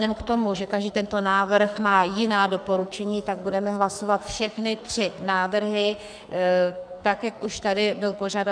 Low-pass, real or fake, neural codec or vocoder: 9.9 kHz; fake; codec, 44.1 kHz, 2.6 kbps, SNAC